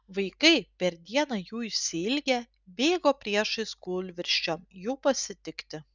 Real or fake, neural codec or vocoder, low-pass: real; none; 7.2 kHz